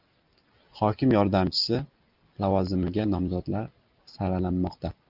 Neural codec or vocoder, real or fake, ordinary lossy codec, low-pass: none; real; Opus, 24 kbps; 5.4 kHz